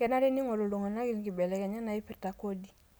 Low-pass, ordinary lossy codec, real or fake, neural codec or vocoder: none; none; real; none